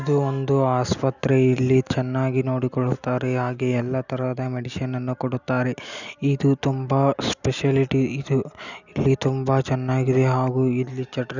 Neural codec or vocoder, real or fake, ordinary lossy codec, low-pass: none; real; none; 7.2 kHz